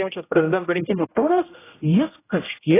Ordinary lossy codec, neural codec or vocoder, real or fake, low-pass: AAC, 16 kbps; codec, 16 kHz, 0.5 kbps, X-Codec, HuBERT features, trained on general audio; fake; 3.6 kHz